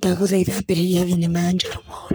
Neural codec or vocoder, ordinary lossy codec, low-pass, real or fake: codec, 44.1 kHz, 3.4 kbps, Pupu-Codec; none; none; fake